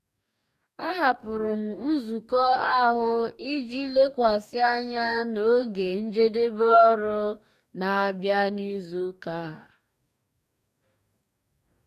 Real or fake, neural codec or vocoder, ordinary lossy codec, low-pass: fake; codec, 44.1 kHz, 2.6 kbps, DAC; none; 14.4 kHz